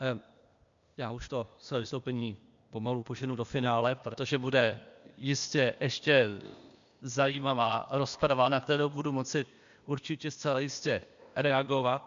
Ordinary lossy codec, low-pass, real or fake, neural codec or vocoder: MP3, 64 kbps; 7.2 kHz; fake; codec, 16 kHz, 0.8 kbps, ZipCodec